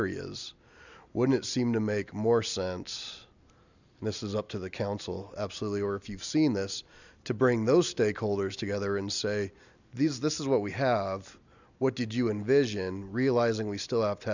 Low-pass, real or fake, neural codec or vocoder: 7.2 kHz; real; none